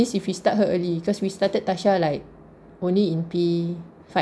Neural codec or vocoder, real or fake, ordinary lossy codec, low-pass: none; real; none; none